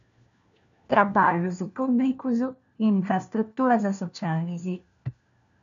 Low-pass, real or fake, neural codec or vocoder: 7.2 kHz; fake; codec, 16 kHz, 1 kbps, FunCodec, trained on LibriTTS, 50 frames a second